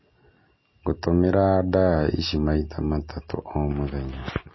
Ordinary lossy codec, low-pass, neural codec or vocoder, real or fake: MP3, 24 kbps; 7.2 kHz; none; real